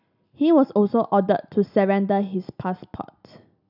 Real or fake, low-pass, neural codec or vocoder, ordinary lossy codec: real; 5.4 kHz; none; none